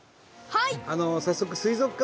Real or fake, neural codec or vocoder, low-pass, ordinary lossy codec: real; none; none; none